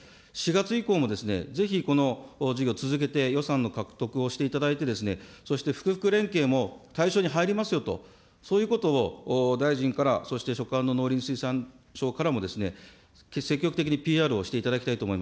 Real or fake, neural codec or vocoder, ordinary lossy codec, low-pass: real; none; none; none